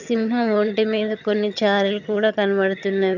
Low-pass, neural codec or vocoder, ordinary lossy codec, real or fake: 7.2 kHz; vocoder, 22.05 kHz, 80 mel bands, HiFi-GAN; none; fake